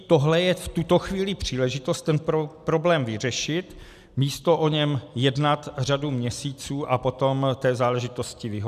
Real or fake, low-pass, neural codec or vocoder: real; 14.4 kHz; none